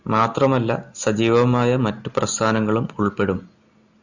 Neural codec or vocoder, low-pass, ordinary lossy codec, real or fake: none; 7.2 kHz; Opus, 64 kbps; real